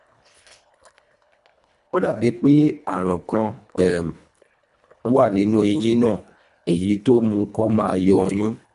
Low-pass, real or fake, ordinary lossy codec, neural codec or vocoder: 10.8 kHz; fake; none; codec, 24 kHz, 1.5 kbps, HILCodec